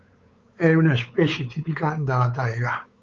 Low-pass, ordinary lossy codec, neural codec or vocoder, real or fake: 7.2 kHz; Opus, 24 kbps; codec, 16 kHz, 4 kbps, X-Codec, WavLM features, trained on Multilingual LibriSpeech; fake